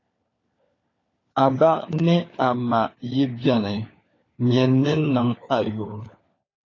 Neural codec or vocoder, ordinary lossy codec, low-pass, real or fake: codec, 16 kHz, 4 kbps, FunCodec, trained on LibriTTS, 50 frames a second; AAC, 32 kbps; 7.2 kHz; fake